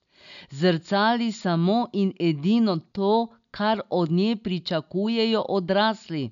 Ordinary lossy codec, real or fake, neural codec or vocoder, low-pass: none; real; none; 7.2 kHz